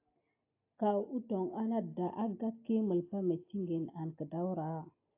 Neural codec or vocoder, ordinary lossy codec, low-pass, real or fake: none; AAC, 32 kbps; 3.6 kHz; real